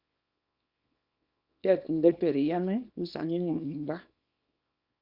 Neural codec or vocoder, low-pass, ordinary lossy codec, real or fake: codec, 24 kHz, 0.9 kbps, WavTokenizer, small release; 5.4 kHz; Opus, 64 kbps; fake